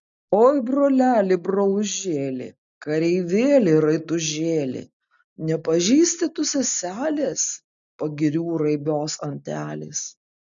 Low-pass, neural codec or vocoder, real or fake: 7.2 kHz; none; real